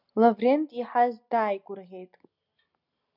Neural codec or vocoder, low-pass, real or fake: none; 5.4 kHz; real